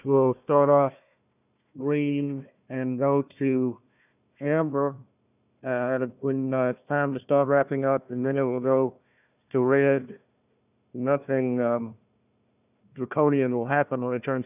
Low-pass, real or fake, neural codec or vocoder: 3.6 kHz; fake; codec, 16 kHz, 1 kbps, FunCodec, trained on Chinese and English, 50 frames a second